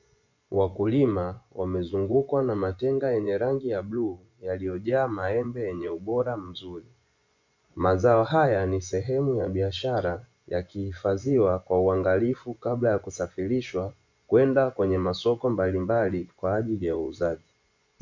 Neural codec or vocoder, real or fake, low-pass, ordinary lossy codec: vocoder, 24 kHz, 100 mel bands, Vocos; fake; 7.2 kHz; MP3, 64 kbps